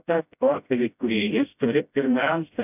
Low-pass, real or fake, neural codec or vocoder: 3.6 kHz; fake; codec, 16 kHz, 0.5 kbps, FreqCodec, smaller model